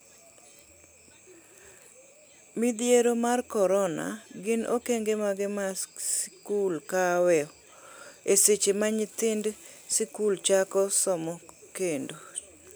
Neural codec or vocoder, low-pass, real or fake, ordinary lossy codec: none; none; real; none